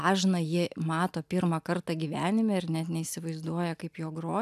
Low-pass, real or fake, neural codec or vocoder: 14.4 kHz; real; none